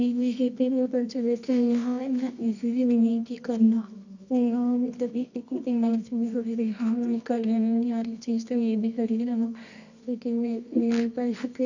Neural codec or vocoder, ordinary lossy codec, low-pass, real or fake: codec, 24 kHz, 0.9 kbps, WavTokenizer, medium music audio release; none; 7.2 kHz; fake